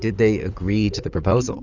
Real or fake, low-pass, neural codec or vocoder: fake; 7.2 kHz; codec, 16 kHz in and 24 kHz out, 2.2 kbps, FireRedTTS-2 codec